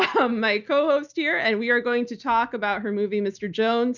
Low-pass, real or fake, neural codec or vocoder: 7.2 kHz; real; none